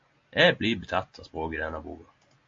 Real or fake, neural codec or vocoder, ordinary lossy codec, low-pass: real; none; AAC, 48 kbps; 7.2 kHz